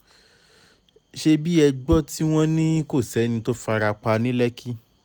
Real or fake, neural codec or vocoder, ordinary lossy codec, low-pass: real; none; none; none